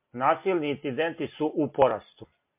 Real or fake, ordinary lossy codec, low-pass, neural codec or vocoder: real; MP3, 32 kbps; 3.6 kHz; none